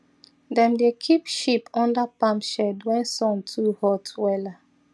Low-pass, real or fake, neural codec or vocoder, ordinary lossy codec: none; real; none; none